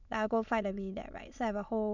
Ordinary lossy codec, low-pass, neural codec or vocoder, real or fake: none; 7.2 kHz; autoencoder, 22.05 kHz, a latent of 192 numbers a frame, VITS, trained on many speakers; fake